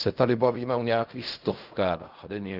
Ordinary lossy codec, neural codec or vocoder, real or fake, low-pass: Opus, 24 kbps; codec, 16 kHz in and 24 kHz out, 0.4 kbps, LongCat-Audio-Codec, fine tuned four codebook decoder; fake; 5.4 kHz